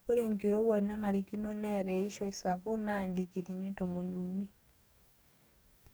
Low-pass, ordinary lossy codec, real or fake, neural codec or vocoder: none; none; fake; codec, 44.1 kHz, 2.6 kbps, DAC